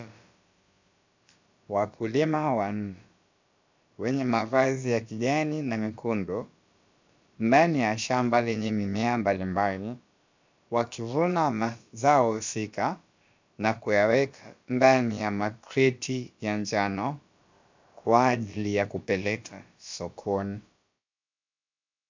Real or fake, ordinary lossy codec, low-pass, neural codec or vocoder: fake; MP3, 64 kbps; 7.2 kHz; codec, 16 kHz, about 1 kbps, DyCAST, with the encoder's durations